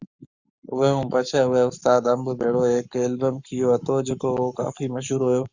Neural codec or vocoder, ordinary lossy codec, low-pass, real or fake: codec, 44.1 kHz, 7.8 kbps, Pupu-Codec; Opus, 64 kbps; 7.2 kHz; fake